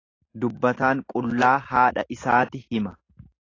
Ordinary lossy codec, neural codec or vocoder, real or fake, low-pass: AAC, 32 kbps; none; real; 7.2 kHz